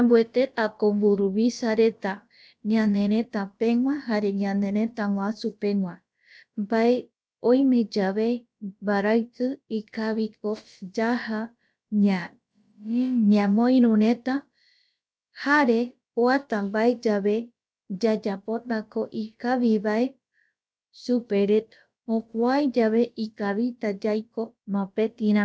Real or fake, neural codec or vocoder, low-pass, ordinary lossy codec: fake; codec, 16 kHz, about 1 kbps, DyCAST, with the encoder's durations; none; none